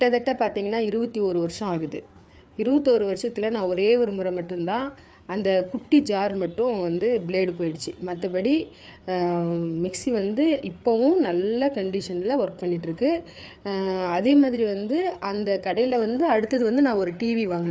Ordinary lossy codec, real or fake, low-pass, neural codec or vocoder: none; fake; none; codec, 16 kHz, 4 kbps, FreqCodec, larger model